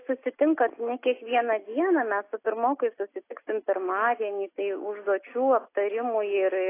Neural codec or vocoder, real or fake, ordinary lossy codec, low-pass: none; real; AAC, 24 kbps; 3.6 kHz